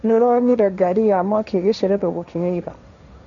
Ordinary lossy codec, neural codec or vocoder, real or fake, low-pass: none; codec, 16 kHz, 1.1 kbps, Voila-Tokenizer; fake; 7.2 kHz